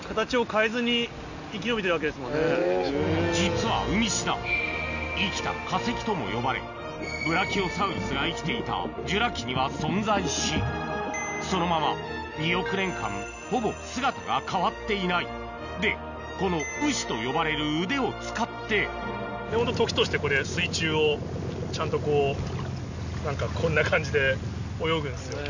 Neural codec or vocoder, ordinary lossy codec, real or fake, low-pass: none; none; real; 7.2 kHz